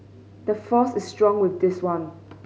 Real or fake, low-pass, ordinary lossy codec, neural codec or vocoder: real; none; none; none